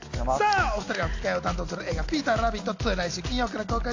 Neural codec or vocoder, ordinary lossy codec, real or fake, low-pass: none; AAC, 48 kbps; real; 7.2 kHz